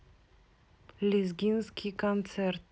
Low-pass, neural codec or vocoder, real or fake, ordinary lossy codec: none; none; real; none